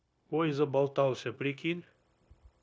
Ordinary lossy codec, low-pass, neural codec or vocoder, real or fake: none; none; codec, 16 kHz, 0.9 kbps, LongCat-Audio-Codec; fake